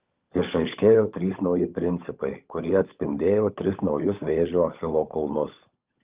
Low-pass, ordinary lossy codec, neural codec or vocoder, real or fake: 3.6 kHz; Opus, 24 kbps; codec, 16 kHz, 16 kbps, FunCodec, trained on LibriTTS, 50 frames a second; fake